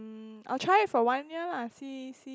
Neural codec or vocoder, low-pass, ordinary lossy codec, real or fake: none; none; none; real